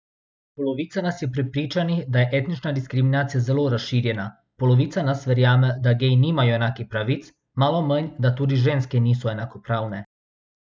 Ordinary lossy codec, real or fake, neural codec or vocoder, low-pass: none; real; none; none